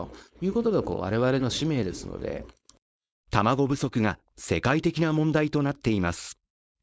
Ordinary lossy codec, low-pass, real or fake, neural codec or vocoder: none; none; fake; codec, 16 kHz, 4.8 kbps, FACodec